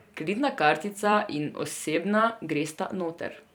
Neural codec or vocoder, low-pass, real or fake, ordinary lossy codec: vocoder, 44.1 kHz, 128 mel bands every 256 samples, BigVGAN v2; none; fake; none